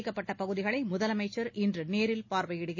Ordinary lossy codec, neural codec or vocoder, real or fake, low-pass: MP3, 32 kbps; none; real; 7.2 kHz